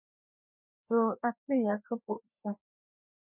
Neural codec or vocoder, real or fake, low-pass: codec, 16 kHz, 2 kbps, FreqCodec, larger model; fake; 3.6 kHz